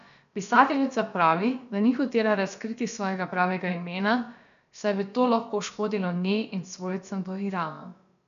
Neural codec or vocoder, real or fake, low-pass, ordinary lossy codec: codec, 16 kHz, about 1 kbps, DyCAST, with the encoder's durations; fake; 7.2 kHz; none